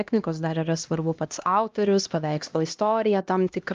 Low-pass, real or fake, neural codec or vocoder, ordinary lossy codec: 7.2 kHz; fake; codec, 16 kHz, 1 kbps, X-Codec, HuBERT features, trained on LibriSpeech; Opus, 32 kbps